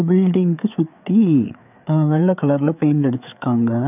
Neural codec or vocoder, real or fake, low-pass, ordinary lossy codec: codec, 16 kHz, 4 kbps, FreqCodec, larger model; fake; 3.6 kHz; none